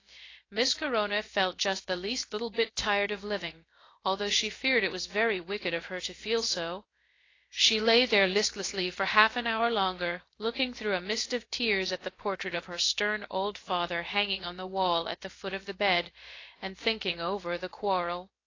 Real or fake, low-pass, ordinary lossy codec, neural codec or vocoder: fake; 7.2 kHz; AAC, 32 kbps; codec, 16 kHz, about 1 kbps, DyCAST, with the encoder's durations